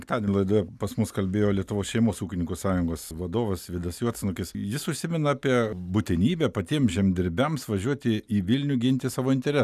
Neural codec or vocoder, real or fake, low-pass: none; real; 14.4 kHz